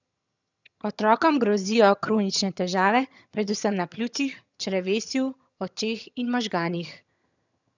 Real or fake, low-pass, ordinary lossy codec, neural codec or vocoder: fake; 7.2 kHz; none; vocoder, 22.05 kHz, 80 mel bands, HiFi-GAN